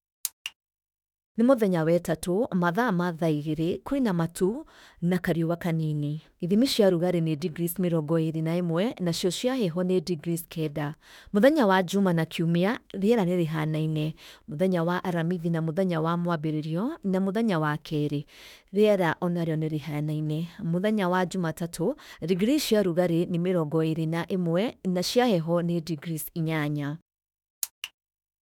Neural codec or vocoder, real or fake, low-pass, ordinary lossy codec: autoencoder, 48 kHz, 32 numbers a frame, DAC-VAE, trained on Japanese speech; fake; 19.8 kHz; none